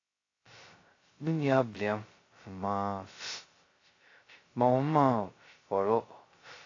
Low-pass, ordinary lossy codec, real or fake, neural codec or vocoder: 7.2 kHz; AAC, 32 kbps; fake; codec, 16 kHz, 0.2 kbps, FocalCodec